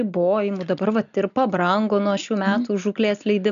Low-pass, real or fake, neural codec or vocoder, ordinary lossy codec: 7.2 kHz; real; none; MP3, 96 kbps